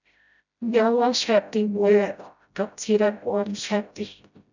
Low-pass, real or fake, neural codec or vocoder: 7.2 kHz; fake; codec, 16 kHz, 0.5 kbps, FreqCodec, smaller model